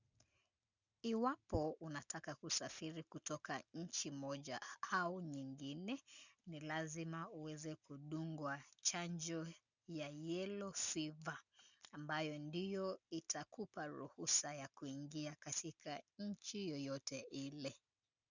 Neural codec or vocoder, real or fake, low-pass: none; real; 7.2 kHz